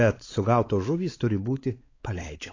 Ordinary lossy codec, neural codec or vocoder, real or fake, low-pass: AAC, 32 kbps; codec, 16 kHz, 4 kbps, X-Codec, WavLM features, trained on Multilingual LibriSpeech; fake; 7.2 kHz